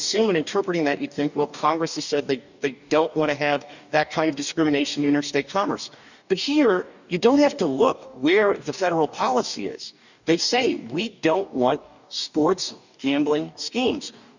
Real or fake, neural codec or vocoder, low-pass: fake; codec, 44.1 kHz, 2.6 kbps, DAC; 7.2 kHz